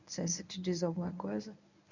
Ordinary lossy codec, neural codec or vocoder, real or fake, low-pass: none; codec, 24 kHz, 0.9 kbps, WavTokenizer, medium speech release version 1; fake; 7.2 kHz